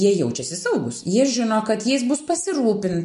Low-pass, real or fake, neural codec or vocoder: 10.8 kHz; real; none